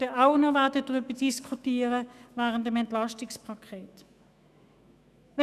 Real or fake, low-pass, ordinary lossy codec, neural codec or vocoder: fake; 14.4 kHz; none; autoencoder, 48 kHz, 128 numbers a frame, DAC-VAE, trained on Japanese speech